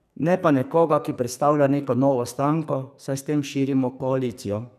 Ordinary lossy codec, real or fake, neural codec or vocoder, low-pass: none; fake; codec, 44.1 kHz, 2.6 kbps, SNAC; 14.4 kHz